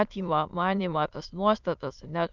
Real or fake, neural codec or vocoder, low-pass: fake; autoencoder, 22.05 kHz, a latent of 192 numbers a frame, VITS, trained on many speakers; 7.2 kHz